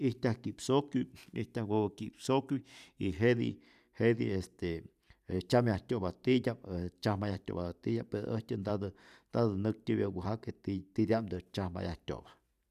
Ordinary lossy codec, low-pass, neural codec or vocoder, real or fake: none; 14.4 kHz; vocoder, 44.1 kHz, 128 mel bands every 512 samples, BigVGAN v2; fake